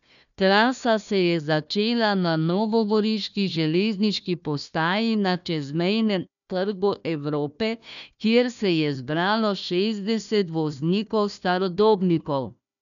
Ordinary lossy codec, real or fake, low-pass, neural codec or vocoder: none; fake; 7.2 kHz; codec, 16 kHz, 1 kbps, FunCodec, trained on Chinese and English, 50 frames a second